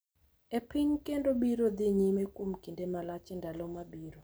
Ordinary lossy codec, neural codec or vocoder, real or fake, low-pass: none; none; real; none